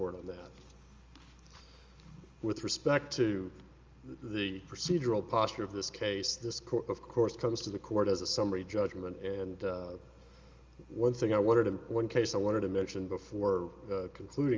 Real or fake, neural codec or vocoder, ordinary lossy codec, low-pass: real; none; Opus, 32 kbps; 7.2 kHz